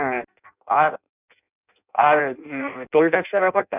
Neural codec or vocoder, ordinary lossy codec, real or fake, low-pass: codec, 16 kHz in and 24 kHz out, 0.6 kbps, FireRedTTS-2 codec; none; fake; 3.6 kHz